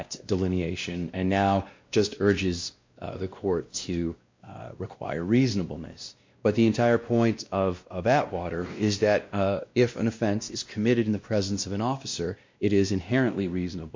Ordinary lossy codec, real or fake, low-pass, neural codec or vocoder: MP3, 48 kbps; fake; 7.2 kHz; codec, 16 kHz, 1 kbps, X-Codec, WavLM features, trained on Multilingual LibriSpeech